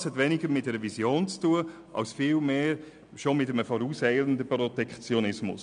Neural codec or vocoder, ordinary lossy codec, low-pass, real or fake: none; none; 9.9 kHz; real